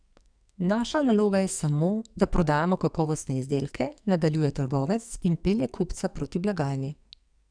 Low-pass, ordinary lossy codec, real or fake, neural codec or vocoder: 9.9 kHz; none; fake; codec, 44.1 kHz, 2.6 kbps, SNAC